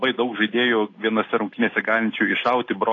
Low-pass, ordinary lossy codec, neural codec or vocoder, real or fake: 7.2 kHz; AAC, 32 kbps; none; real